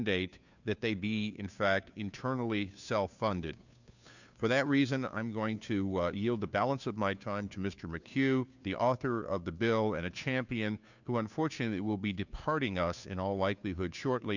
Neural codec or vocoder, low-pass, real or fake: codec, 16 kHz, 2 kbps, FunCodec, trained on Chinese and English, 25 frames a second; 7.2 kHz; fake